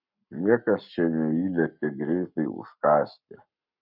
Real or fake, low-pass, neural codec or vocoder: fake; 5.4 kHz; codec, 44.1 kHz, 7.8 kbps, Pupu-Codec